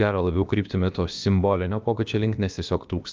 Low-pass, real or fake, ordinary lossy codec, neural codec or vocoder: 7.2 kHz; fake; Opus, 24 kbps; codec, 16 kHz, about 1 kbps, DyCAST, with the encoder's durations